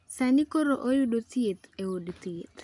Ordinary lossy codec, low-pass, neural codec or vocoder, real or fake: none; 10.8 kHz; none; real